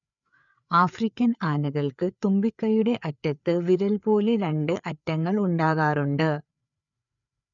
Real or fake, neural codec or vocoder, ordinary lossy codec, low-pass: fake; codec, 16 kHz, 4 kbps, FreqCodec, larger model; none; 7.2 kHz